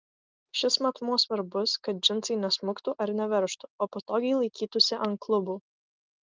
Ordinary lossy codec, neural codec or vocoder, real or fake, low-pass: Opus, 32 kbps; none; real; 7.2 kHz